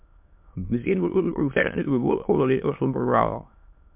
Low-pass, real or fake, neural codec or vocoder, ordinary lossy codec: 3.6 kHz; fake; autoencoder, 22.05 kHz, a latent of 192 numbers a frame, VITS, trained on many speakers; MP3, 32 kbps